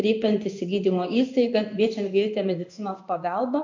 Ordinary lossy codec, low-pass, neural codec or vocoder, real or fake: MP3, 48 kbps; 7.2 kHz; codec, 16 kHz in and 24 kHz out, 1 kbps, XY-Tokenizer; fake